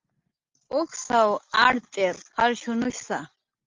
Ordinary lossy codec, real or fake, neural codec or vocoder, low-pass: Opus, 32 kbps; real; none; 7.2 kHz